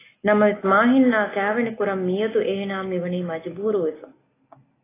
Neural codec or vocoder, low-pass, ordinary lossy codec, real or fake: none; 3.6 kHz; AAC, 16 kbps; real